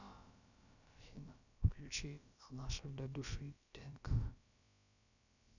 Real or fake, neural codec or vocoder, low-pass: fake; codec, 16 kHz, about 1 kbps, DyCAST, with the encoder's durations; 7.2 kHz